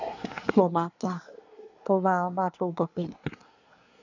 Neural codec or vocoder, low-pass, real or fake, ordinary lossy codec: codec, 24 kHz, 1 kbps, SNAC; 7.2 kHz; fake; none